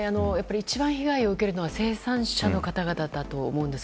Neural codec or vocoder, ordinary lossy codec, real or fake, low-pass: none; none; real; none